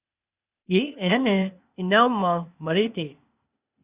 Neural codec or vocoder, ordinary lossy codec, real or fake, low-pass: codec, 16 kHz, 0.8 kbps, ZipCodec; Opus, 64 kbps; fake; 3.6 kHz